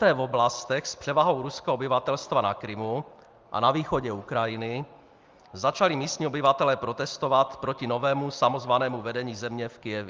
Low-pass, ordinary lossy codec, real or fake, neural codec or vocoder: 7.2 kHz; Opus, 24 kbps; real; none